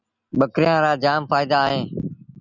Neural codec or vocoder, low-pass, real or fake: none; 7.2 kHz; real